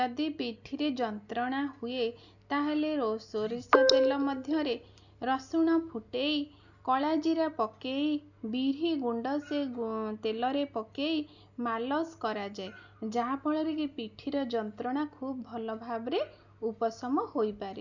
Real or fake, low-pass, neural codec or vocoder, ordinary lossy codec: real; 7.2 kHz; none; none